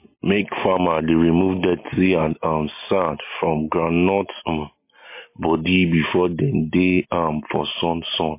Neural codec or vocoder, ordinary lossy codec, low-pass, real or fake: none; MP3, 24 kbps; 3.6 kHz; real